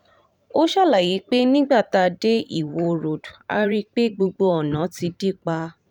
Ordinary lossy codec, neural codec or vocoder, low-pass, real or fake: none; vocoder, 44.1 kHz, 128 mel bands every 512 samples, BigVGAN v2; 19.8 kHz; fake